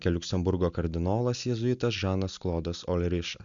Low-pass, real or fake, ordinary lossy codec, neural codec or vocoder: 7.2 kHz; real; Opus, 64 kbps; none